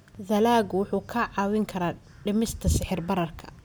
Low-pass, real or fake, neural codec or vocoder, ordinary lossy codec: none; real; none; none